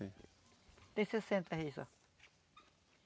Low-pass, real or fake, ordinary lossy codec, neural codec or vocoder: none; real; none; none